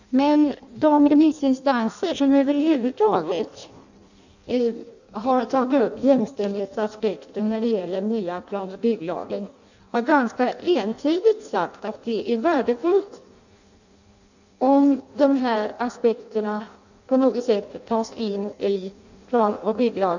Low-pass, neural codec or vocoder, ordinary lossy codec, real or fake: 7.2 kHz; codec, 16 kHz in and 24 kHz out, 0.6 kbps, FireRedTTS-2 codec; none; fake